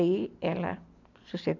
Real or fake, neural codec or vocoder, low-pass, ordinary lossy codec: real; none; 7.2 kHz; none